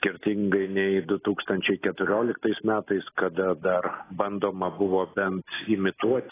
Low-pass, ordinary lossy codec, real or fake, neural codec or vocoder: 3.6 kHz; AAC, 16 kbps; real; none